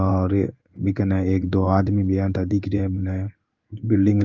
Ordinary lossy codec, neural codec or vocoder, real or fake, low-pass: Opus, 32 kbps; codec, 16 kHz in and 24 kHz out, 1 kbps, XY-Tokenizer; fake; 7.2 kHz